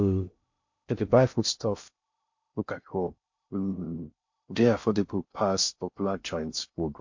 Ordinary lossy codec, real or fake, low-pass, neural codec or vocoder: MP3, 48 kbps; fake; 7.2 kHz; codec, 16 kHz in and 24 kHz out, 0.6 kbps, FocalCodec, streaming, 2048 codes